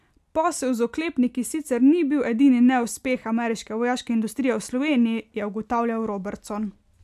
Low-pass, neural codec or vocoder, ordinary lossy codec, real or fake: 14.4 kHz; none; AAC, 96 kbps; real